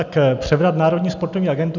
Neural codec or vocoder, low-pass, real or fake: none; 7.2 kHz; real